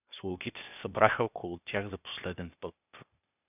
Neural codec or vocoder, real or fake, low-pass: codec, 16 kHz, 0.8 kbps, ZipCodec; fake; 3.6 kHz